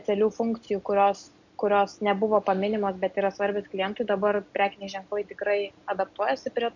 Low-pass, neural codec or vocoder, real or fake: 7.2 kHz; none; real